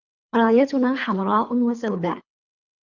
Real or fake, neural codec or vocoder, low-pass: fake; codec, 24 kHz, 3 kbps, HILCodec; 7.2 kHz